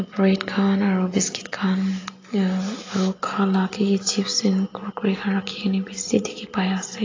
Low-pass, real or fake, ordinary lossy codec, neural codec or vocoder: 7.2 kHz; real; AAC, 32 kbps; none